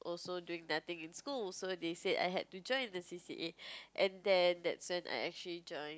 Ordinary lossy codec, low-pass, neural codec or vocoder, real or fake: none; none; none; real